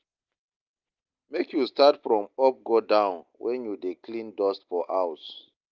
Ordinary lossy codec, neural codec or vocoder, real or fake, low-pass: Opus, 24 kbps; none; real; 7.2 kHz